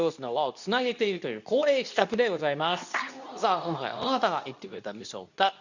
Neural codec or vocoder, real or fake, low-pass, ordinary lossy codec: codec, 24 kHz, 0.9 kbps, WavTokenizer, medium speech release version 2; fake; 7.2 kHz; none